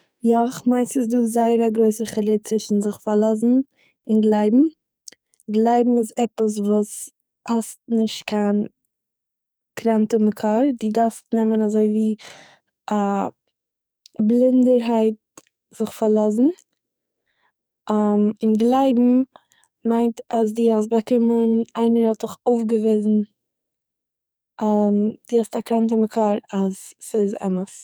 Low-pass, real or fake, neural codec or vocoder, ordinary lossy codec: none; fake; codec, 44.1 kHz, 2.6 kbps, SNAC; none